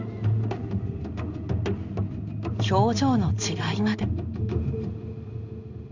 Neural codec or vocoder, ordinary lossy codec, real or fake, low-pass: codec, 16 kHz in and 24 kHz out, 1 kbps, XY-Tokenizer; Opus, 64 kbps; fake; 7.2 kHz